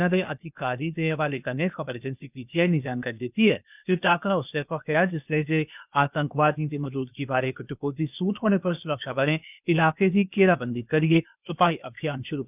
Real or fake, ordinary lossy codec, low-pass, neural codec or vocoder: fake; none; 3.6 kHz; codec, 16 kHz, 0.8 kbps, ZipCodec